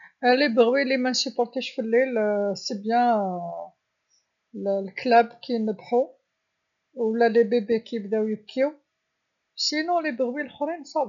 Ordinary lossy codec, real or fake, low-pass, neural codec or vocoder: none; real; 7.2 kHz; none